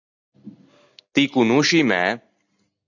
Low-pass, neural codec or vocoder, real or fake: 7.2 kHz; none; real